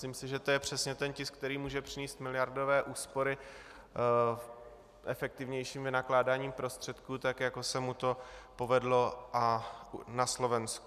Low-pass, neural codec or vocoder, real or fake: 14.4 kHz; none; real